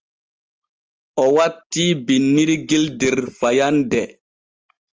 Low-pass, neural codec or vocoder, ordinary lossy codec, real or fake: 7.2 kHz; none; Opus, 24 kbps; real